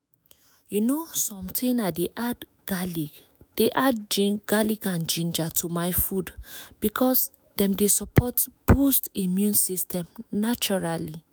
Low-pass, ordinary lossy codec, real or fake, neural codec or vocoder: none; none; fake; autoencoder, 48 kHz, 128 numbers a frame, DAC-VAE, trained on Japanese speech